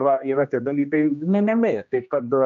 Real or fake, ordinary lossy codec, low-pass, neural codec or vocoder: fake; AAC, 64 kbps; 7.2 kHz; codec, 16 kHz, 1 kbps, X-Codec, HuBERT features, trained on general audio